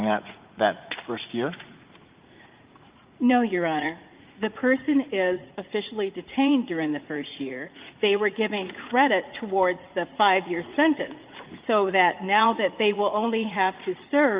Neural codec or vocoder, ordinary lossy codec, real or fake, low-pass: vocoder, 44.1 kHz, 80 mel bands, Vocos; Opus, 32 kbps; fake; 3.6 kHz